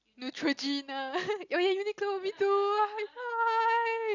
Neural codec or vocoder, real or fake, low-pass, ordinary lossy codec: none; real; 7.2 kHz; none